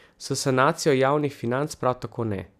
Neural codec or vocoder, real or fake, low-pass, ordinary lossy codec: none; real; 14.4 kHz; none